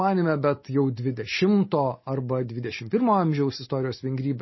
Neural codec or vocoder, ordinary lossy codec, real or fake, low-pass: none; MP3, 24 kbps; real; 7.2 kHz